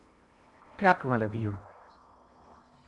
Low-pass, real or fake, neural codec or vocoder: 10.8 kHz; fake; codec, 16 kHz in and 24 kHz out, 0.8 kbps, FocalCodec, streaming, 65536 codes